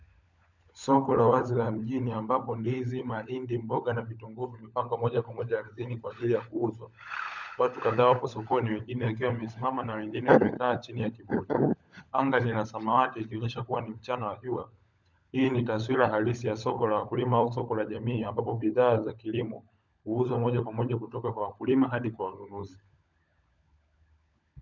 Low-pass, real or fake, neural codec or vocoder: 7.2 kHz; fake; codec, 16 kHz, 16 kbps, FunCodec, trained on LibriTTS, 50 frames a second